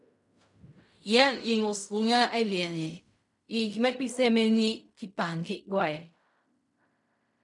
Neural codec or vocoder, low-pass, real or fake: codec, 16 kHz in and 24 kHz out, 0.4 kbps, LongCat-Audio-Codec, fine tuned four codebook decoder; 10.8 kHz; fake